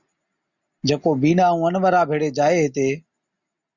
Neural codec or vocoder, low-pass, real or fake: none; 7.2 kHz; real